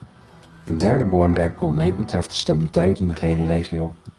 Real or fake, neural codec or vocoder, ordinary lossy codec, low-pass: fake; codec, 24 kHz, 0.9 kbps, WavTokenizer, medium music audio release; Opus, 32 kbps; 10.8 kHz